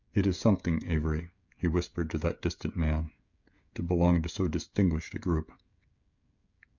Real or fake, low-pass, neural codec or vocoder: fake; 7.2 kHz; codec, 16 kHz, 16 kbps, FreqCodec, smaller model